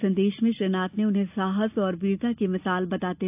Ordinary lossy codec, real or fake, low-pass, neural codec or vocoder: AAC, 32 kbps; real; 3.6 kHz; none